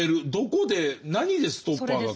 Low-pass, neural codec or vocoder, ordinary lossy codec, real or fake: none; none; none; real